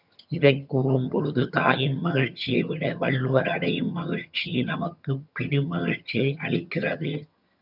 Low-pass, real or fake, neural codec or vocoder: 5.4 kHz; fake; vocoder, 22.05 kHz, 80 mel bands, HiFi-GAN